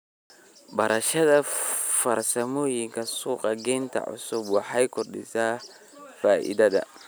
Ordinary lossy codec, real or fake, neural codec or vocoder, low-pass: none; real; none; none